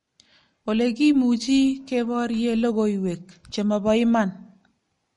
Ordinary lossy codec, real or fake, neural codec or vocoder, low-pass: MP3, 48 kbps; real; none; 19.8 kHz